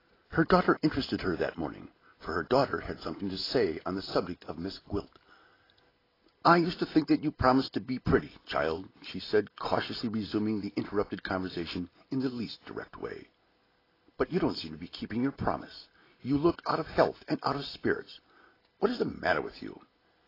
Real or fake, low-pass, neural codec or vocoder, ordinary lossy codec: real; 5.4 kHz; none; AAC, 24 kbps